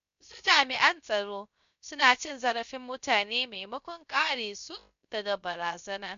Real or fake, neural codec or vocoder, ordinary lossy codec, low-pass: fake; codec, 16 kHz, 0.3 kbps, FocalCodec; MP3, 64 kbps; 7.2 kHz